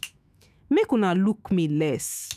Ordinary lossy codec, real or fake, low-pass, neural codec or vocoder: none; fake; 14.4 kHz; autoencoder, 48 kHz, 128 numbers a frame, DAC-VAE, trained on Japanese speech